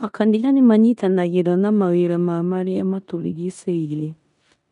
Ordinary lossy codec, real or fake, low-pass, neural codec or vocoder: none; fake; 10.8 kHz; codec, 16 kHz in and 24 kHz out, 0.9 kbps, LongCat-Audio-Codec, four codebook decoder